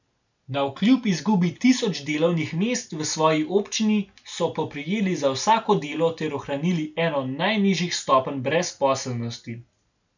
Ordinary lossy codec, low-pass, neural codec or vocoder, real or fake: none; 7.2 kHz; none; real